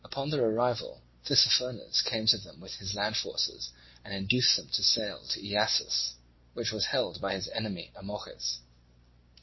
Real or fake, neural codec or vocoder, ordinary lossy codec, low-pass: real; none; MP3, 24 kbps; 7.2 kHz